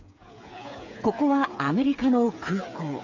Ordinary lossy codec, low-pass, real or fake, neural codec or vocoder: AAC, 48 kbps; 7.2 kHz; fake; codec, 16 kHz, 8 kbps, FreqCodec, smaller model